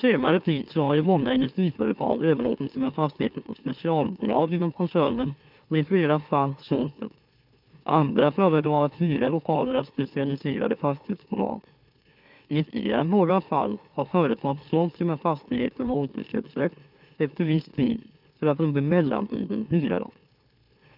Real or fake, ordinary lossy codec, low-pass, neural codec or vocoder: fake; none; 5.4 kHz; autoencoder, 44.1 kHz, a latent of 192 numbers a frame, MeloTTS